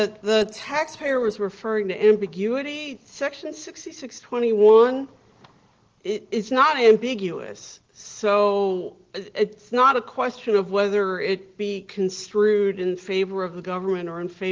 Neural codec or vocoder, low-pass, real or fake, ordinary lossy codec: none; 7.2 kHz; real; Opus, 16 kbps